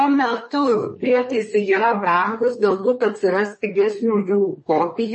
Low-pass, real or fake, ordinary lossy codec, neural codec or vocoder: 10.8 kHz; fake; MP3, 32 kbps; codec, 24 kHz, 1 kbps, SNAC